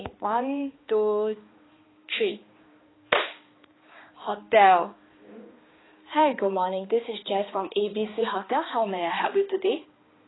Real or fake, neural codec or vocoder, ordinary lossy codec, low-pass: fake; codec, 16 kHz, 2 kbps, X-Codec, HuBERT features, trained on balanced general audio; AAC, 16 kbps; 7.2 kHz